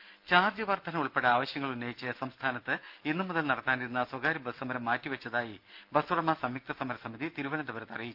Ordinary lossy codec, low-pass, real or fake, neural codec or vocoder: Opus, 24 kbps; 5.4 kHz; real; none